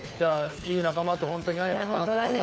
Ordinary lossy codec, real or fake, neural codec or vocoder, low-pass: none; fake; codec, 16 kHz, 4 kbps, FunCodec, trained on LibriTTS, 50 frames a second; none